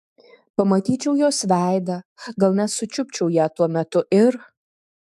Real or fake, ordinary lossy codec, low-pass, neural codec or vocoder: fake; AAC, 96 kbps; 14.4 kHz; autoencoder, 48 kHz, 128 numbers a frame, DAC-VAE, trained on Japanese speech